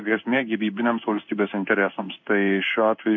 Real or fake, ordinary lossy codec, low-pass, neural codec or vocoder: fake; MP3, 64 kbps; 7.2 kHz; codec, 16 kHz in and 24 kHz out, 1 kbps, XY-Tokenizer